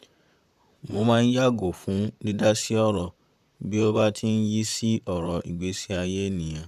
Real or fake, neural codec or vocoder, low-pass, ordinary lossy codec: fake; vocoder, 44.1 kHz, 128 mel bands, Pupu-Vocoder; 14.4 kHz; none